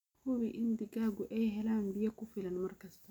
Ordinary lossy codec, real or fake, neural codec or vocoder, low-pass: none; real; none; 19.8 kHz